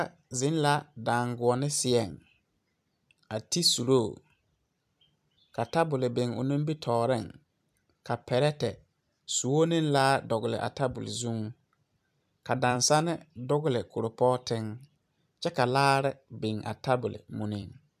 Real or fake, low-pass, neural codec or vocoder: fake; 14.4 kHz; vocoder, 44.1 kHz, 128 mel bands every 256 samples, BigVGAN v2